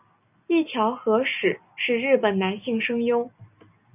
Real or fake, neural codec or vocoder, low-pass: real; none; 3.6 kHz